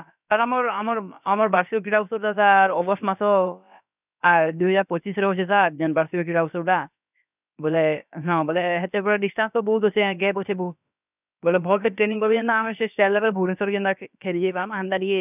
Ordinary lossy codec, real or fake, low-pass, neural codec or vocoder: none; fake; 3.6 kHz; codec, 16 kHz, about 1 kbps, DyCAST, with the encoder's durations